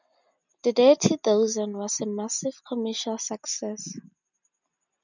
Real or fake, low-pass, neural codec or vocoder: real; 7.2 kHz; none